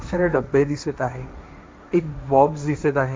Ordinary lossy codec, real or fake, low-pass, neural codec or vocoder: none; fake; none; codec, 16 kHz, 1.1 kbps, Voila-Tokenizer